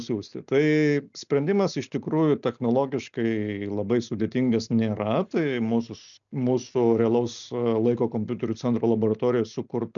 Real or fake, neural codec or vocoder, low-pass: real; none; 7.2 kHz